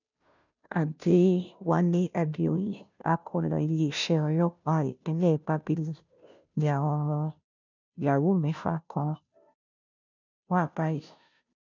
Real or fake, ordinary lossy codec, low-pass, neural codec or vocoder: fake; none; 7.2 kHz; codec, 16 kHz, 0.5 kbps, FunCodec, trained on Chinese and English, 25 frames a second